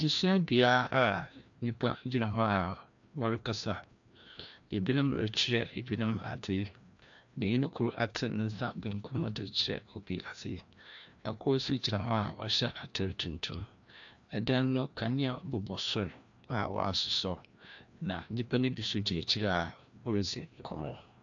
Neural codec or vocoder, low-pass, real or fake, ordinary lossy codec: codec, 16 kHz, 1 kbps, FreqCodec, larger model; 7.2 kHz; fake; AAC, 64 kbps